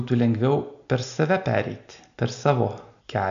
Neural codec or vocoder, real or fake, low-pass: none; real; 7.2 kHz